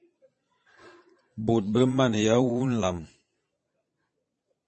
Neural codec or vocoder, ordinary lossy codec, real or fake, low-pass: vocoder, 22.05 kHz, 80 mel bands, Vocos; MP3, 32 kbps; fake; 9.9 kHz